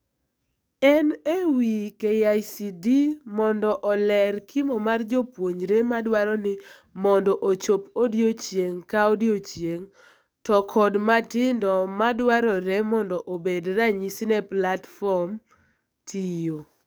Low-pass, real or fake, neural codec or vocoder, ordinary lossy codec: none; fake; codec, 44.1 kHz, 7.8 kbps, DAC; none